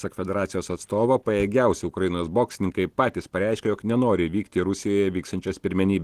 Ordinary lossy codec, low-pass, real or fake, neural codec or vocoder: Opus, 16 kbps; 14.4 kHz; real; none